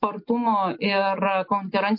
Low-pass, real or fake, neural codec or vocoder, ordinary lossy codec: 5.4 kHz; real; none; MP3, 32 kbps